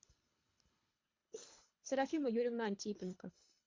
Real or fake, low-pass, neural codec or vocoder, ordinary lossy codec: fake; 7.2 kHz; codec, 24 kHz, 3 kbps, HILCodec; MP3, 48 kbps